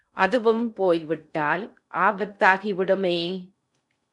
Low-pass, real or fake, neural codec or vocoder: 10.8 kHz; fake; codec, 16 kHz in and 24 kHz out, 0.6 kbps, FocalCodec, streaming, 2048 codes